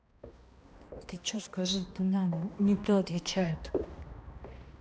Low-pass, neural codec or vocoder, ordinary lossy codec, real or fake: none; codec, 16 kHz, 1 kbps, X-Codec, HuBERT features, trained on balanced general audio; none; fake